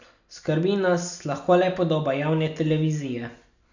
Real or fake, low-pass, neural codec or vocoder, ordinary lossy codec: real; 7.2 kHz; none; none